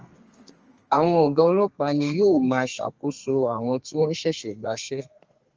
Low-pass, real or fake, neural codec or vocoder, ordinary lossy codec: 7.2 kHz; fake; codec, 32 kHz, 1.9 kbps, SNAC; Opus, 24 kbps